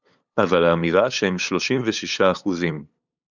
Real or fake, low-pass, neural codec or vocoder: fake; 7.2 kHz; codec, 16 kHz, 8 kbps, FunCodec, trained on LibriTTS, 25 frames a second